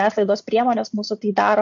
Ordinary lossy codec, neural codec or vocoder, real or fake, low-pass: AAC, 64 kbps; none; real; 7.2 kHz